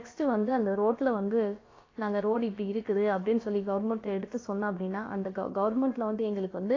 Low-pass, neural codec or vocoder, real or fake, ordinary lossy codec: 7.2 kHz; codec, 16 kHz, about 1 kbps, DyCAST, with the encoder's durations; fake; AAC, 32 kbps